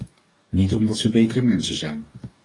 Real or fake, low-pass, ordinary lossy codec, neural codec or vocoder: fake; 10.8 kHz; AAC, 32 kbps; codec, 44.1 kHz, 2.6 kbps, DAC